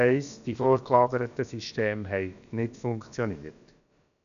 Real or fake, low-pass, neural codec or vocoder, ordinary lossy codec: fake; 7.2 kHz; codec, 16 kHz, about 1 kbps, DyCAST, with the encoder's durations; none